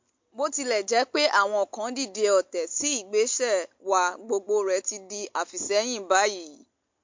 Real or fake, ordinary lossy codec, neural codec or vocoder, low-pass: real; MP3, 48 kbps; none; 7.2 kHz